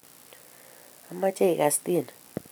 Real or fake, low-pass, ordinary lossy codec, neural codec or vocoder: real; none; none; none